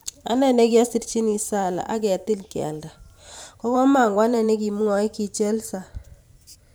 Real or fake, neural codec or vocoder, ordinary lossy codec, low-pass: fake; vocoder, 44.1 kHz, 128 mel bands every 512 samples, BigVGAN v2; none; none